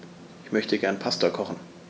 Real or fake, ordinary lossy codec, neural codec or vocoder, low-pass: real; none; none; none